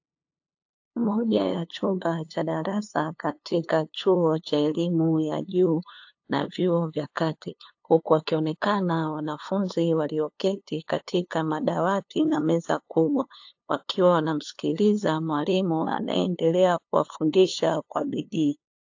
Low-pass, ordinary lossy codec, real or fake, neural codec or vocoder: 7.2 kHz; AAC, 48 kbps; fake; codec, 16 kHz, 2 kbps, FunCodec, trained on LibriTTS, 25 frames a second